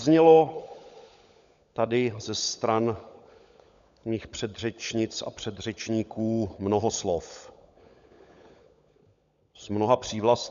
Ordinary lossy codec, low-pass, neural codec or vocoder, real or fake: MP3, 96 kbps; 7.2 kHz; codec, 16 kHz, 16 kbps, FunCodec, trained on LibriTTS, 50 frames a second; fake